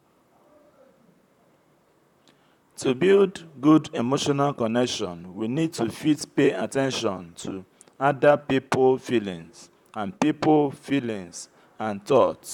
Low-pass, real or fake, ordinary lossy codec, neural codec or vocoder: 19.8 kHz; fake; none; vocoder, 44.1 kHz, 128 mel bands, Pupu-Vocoder